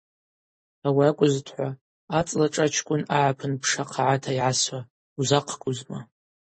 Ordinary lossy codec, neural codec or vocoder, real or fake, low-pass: MP3, 32 kbps; none; real; 10.8 kHz